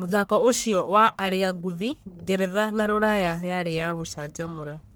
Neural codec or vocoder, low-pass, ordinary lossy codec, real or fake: codec, 44.1 kHz, 1.7 kbps, Pupu-Codec; none; none; fake